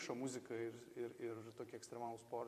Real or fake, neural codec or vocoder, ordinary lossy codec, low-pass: fake; vocoder, 44.1 kHz, 128 mel bands every 512 samples, BigVGAN v2; MP3, 64 kbps; 14.4 kHz